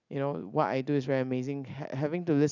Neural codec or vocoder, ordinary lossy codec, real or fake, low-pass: none; none; real; 7.2 kHz